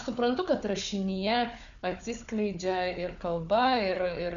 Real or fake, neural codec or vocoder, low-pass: fake; codec, 16 kHz, 4 kbps, FunCodec, trained on Chinese and English, 50 frames a second; 7.2 kHz